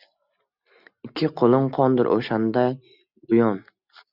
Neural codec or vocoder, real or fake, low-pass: none; real; 5.4 kHz